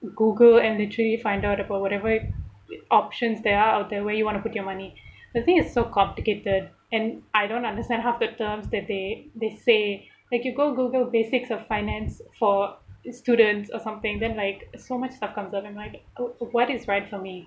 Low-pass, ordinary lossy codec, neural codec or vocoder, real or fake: none; none; none; real